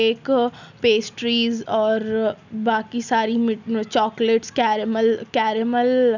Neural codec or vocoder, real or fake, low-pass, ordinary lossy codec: none; real; 7.2 kHz; none